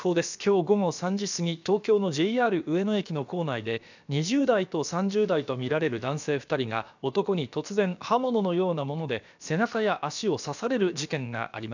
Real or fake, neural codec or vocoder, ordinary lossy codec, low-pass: fake; codec, 16 kHz, about 1 kbps, DyCAST, with the encoder's durations; none; 7.2 kHz